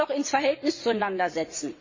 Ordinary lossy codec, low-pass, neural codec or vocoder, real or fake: MP3, 32 kbps; 7.2 kHz; vocoder, 22.05 kHz, 80 mel bands, WaveNeXt; fake